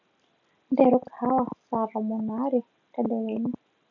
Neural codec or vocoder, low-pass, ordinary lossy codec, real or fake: none; 7.2 kHz; none; real